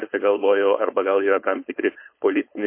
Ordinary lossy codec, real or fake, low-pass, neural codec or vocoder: MP3, 24 kbps; fake; 3.6 kHz; codec, 16 kHz, 4.8 kbps, FACodec